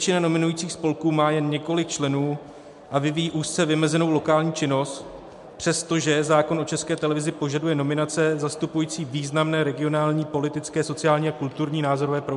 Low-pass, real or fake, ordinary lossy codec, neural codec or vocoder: 10.8 kHz; real; MP3, 64 kbps; none